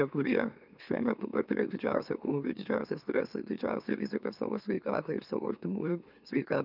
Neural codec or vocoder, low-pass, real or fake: autoencoder, 44.1 kHz, a latent of 192 numbers a frame, MeloTTS; 5.4 kHz; fake